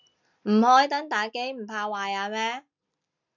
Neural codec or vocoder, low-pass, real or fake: none; 7.2 kHz; real